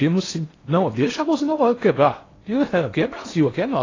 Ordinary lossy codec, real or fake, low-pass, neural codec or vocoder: AAC, 32 kbps; fake; 7.2 kHz; codec, 16 kHz in and 24 kHz out, 0.8 kbps, FocalCodec, streaming, 65536 codes